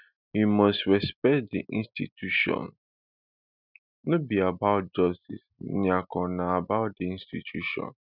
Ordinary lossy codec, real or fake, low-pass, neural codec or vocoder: none; real; 5.4 kHz; none